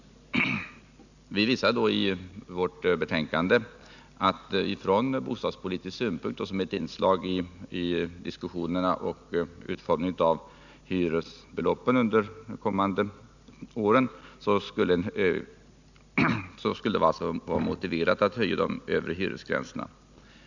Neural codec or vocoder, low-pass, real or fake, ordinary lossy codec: none; 7.2 kHz; real; none